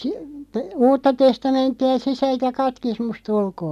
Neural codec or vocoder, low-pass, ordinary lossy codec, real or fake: none; 14.4 kHz; none; real